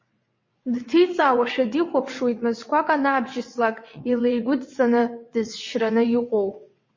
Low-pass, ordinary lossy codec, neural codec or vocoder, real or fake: 7.2 kHz; MP3, 32 kbps; vocoder, 22.05 kHz, 80 mel bands, WaveNeXt; fake